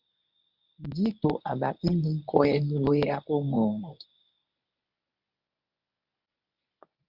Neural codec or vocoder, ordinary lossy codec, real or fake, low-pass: codec, 24 kHz, 0.9 kbps, WavTokenizer, medium speech release version 1; Opus, 64 kbps; fake; 5.4 kHz